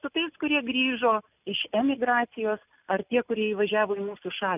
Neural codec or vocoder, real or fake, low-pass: none; real; 3.6 kHz